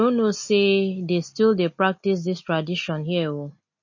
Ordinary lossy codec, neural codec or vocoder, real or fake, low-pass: MP3, 32 kbps; none; real; 7.2 kHz